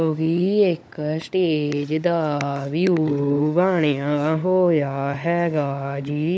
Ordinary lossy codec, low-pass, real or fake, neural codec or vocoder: none; none; fake; codec, 16 kHz, 16 kbps, FunCodec, trained on LibriTTS, 50 frames a second